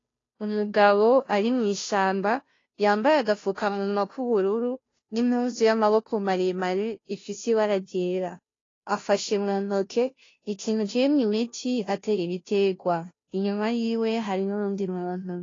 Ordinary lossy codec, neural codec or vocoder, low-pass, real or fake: AAC, 32 kbps; codec, 16 kHz, 0.5 kbps, FunCodec, trained on Chinese and English, 25 frames a second; 7.2 kHz; fake